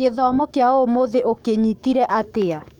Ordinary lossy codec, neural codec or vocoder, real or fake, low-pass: none; codec, 44.1 kHz, 7.8 kbps, DAC; fake; 19.8 kHz